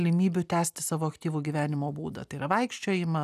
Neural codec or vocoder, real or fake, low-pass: none; real; 14.4 kHz